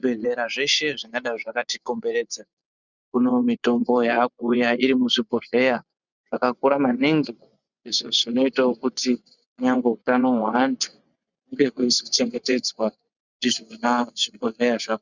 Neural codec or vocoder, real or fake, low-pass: vocoder, 24 kHz, 100 mel bands, Vocos; fake; 7.2 kHz